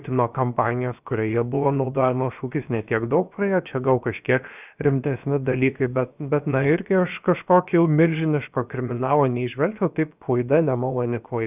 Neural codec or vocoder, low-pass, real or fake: codec, 16 kHz, about 1 kbps, DyCAST, with the encoder's durations; 3.6 kHz; fake